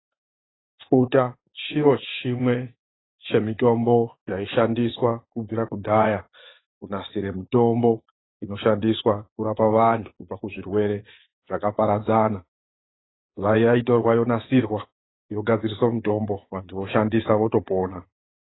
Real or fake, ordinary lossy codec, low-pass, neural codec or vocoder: fake; AAC, 16 kbps; 7.2 kHz; vocoder, 24 kHz, 100 mel bands, Vocos